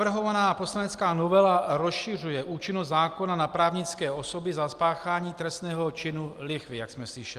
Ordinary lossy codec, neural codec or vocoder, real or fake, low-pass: Opus, 32 kbps; none; real; 14.4 kHz